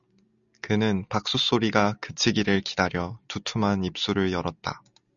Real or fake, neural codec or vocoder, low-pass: real; none; 7.2 kHz